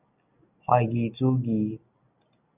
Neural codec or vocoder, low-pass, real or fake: none; 3.6 kHz; real